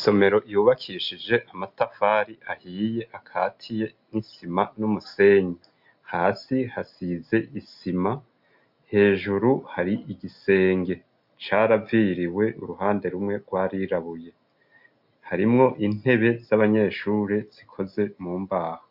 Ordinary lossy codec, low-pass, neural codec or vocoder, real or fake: MP3, 48 kbps; 5.4 kHz; none; real